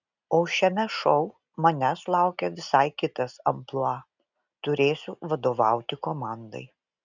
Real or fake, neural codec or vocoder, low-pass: real; none; 7.2 kHz